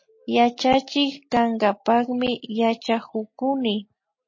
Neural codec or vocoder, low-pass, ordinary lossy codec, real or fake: none; 7.2 kHz; MP3, 32 kbps; real